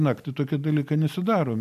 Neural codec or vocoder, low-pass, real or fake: none; 14.4 kHz; real